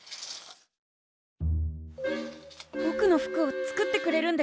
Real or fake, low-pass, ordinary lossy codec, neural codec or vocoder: real; none; none; none